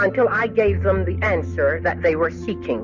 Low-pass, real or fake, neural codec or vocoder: 7.2 kHz; real; none